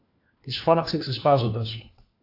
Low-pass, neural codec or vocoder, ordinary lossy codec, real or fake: 5.4 kHz; codec, 16 kHz, 1 kbps, FunCodec, trained on LibriTTS, 50 frames a second; AAC, 32 kbps; fake